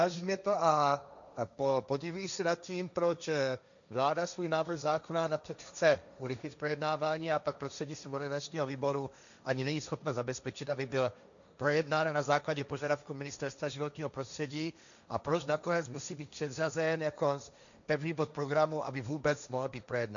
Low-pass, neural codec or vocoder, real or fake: 7.2 kHz; codec, 16 kHz, 1.1 kbps, Voila-Tokenizer; fake